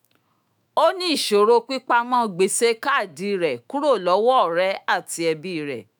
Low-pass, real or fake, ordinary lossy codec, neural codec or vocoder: none; fake; none; autoencoder, 48 kHz, 128 numbers a frame, DAC-VAE, trained on Japanese speech